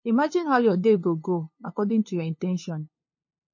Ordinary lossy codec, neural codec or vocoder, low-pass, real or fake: MP3, 32 kbps; codec, 16 kHz, 2 kbps, FunCodec, trained on LibriTTS, 25 frames a second; 7.2 kHz; fake